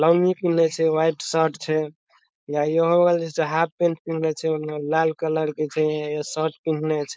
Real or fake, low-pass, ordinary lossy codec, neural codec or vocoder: fake; none; none; codec, 16 kHz, 4.8 kbps, FACodec